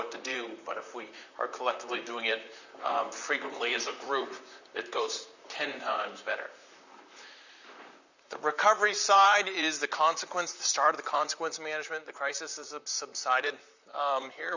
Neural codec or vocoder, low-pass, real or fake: vocoder, 44.1 kHz, 128 mel bands, Pupu-Vocoder; 7.2 kHz; fake